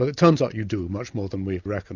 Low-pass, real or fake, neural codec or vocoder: 7.2 kHz; real; none